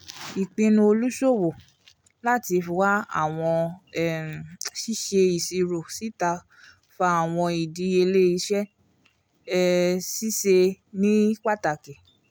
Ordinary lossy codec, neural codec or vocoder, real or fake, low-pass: none; none; real; none